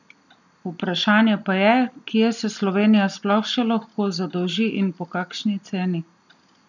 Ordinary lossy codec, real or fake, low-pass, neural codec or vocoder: none; real; 7.2 kHz; none